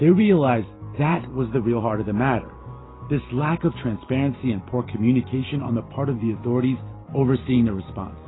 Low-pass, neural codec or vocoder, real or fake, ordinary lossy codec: 7.2 kHz; vocoder, 44.1 kHz, 128 mel bands every 512 samples, BigVGAN v2; fake; AAC, 16 kbps